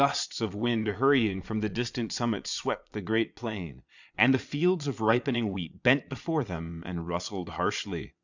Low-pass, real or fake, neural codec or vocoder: 7.2 kHz; fake; vocoder, 22.05 kHz, 80 mel bands, Vocos